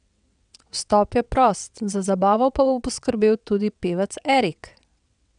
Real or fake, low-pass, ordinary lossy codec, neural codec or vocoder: fake; 9.9 kHz; none; vocoder, 22.05 kHz, 80 mel bands, WaveNeXt